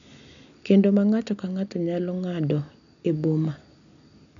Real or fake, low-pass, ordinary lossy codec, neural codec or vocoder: real; 7.2 kHz; none; none